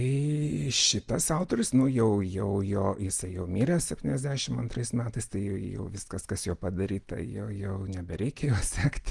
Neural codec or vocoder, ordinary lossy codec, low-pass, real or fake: none; Opus, 24 kbps; 10.8 kHz; real